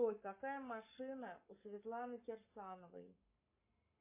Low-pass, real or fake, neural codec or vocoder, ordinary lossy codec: 3.6 kHz; fake; codec, 44.1 kHz, 7.8 kbps, Pupu-Codec; MP3, 24 kbps